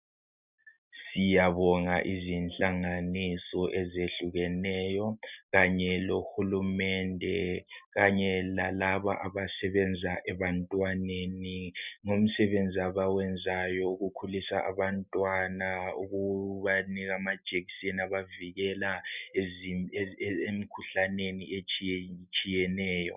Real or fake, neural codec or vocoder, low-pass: real; none; 3.6 kHz